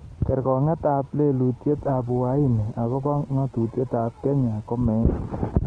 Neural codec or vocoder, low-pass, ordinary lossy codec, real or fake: none; 9.9 kHz; Opus, 16 kbps; real